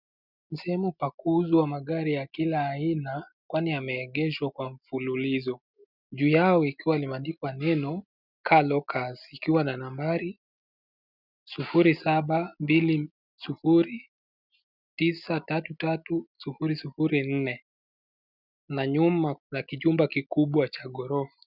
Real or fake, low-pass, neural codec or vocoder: real; 5.4 kHz; none